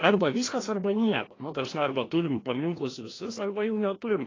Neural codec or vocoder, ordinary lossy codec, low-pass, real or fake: codec, 16 kHz, 1 kbps, FreqCodec, larger model; AAC, 32 kbps; 7.2 kHz; fake